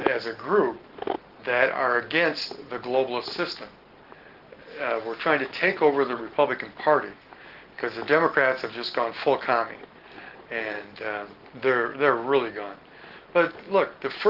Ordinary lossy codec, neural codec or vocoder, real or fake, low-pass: Opus, 16 kbps; none; real; 5.4 kHz